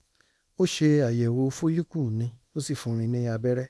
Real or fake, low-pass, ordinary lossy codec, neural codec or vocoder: fake; none; none; codec, 24 kHz, 0.9 kbps, WavTokenizer, small release